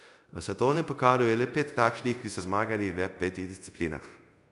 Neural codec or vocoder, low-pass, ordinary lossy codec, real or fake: codec, 24 kHz, 0.5 kbps, DualCodec; 10.8 kHz; none; fake